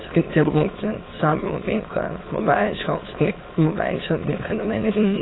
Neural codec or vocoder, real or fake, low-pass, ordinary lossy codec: autoencoder, 22.05 kHz, a latent of 192 numbers a frame, VITS, trained on many speakers; fake; 7.2 kHz; AAC, 16 kbps